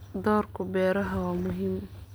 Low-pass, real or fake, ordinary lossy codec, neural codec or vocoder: none; real; none; none